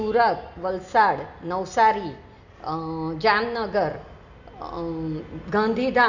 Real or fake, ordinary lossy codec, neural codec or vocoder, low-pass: real; none; none; 7.2 kHz